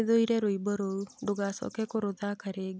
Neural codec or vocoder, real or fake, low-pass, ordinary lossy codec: none; real; none; none